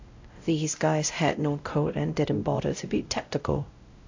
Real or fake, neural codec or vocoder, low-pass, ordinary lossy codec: fake; codec, 16 kHz, 0.5 kbps, X-Codec, WavLM features, trained on Multilingual LibriSpeech; 7.2 kHz; AAC, 48 kbps